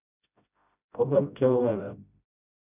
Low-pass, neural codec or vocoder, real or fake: 3.6 kHz; codec, 16 kHz, 0.5 kbps, FreqCodec, smaller model; fake